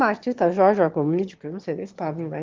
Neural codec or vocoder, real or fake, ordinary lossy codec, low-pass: autoencoder, 22.05 kHz, a latent of 192 numbers a frame, VITS, trained on one speaker; fake; Opus, 16 kbps; 7.2 kHz